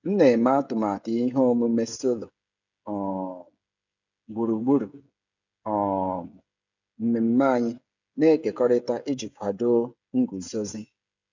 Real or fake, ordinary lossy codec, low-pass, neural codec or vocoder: fake; none; 7.2 kHz; codec, 16 kHz, 16 kbps, FreqCodec, smaller model